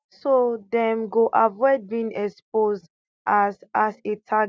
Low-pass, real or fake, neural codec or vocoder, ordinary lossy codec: none; real; none; none